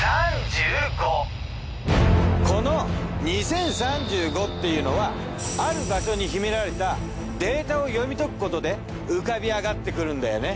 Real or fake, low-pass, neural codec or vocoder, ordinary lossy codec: real; none; none; none